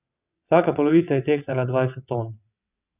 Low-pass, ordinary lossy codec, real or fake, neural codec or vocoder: 3.6 kHz; none; fake; vocoder, 22.05 kHz, 80 mel bands, WaveNeXt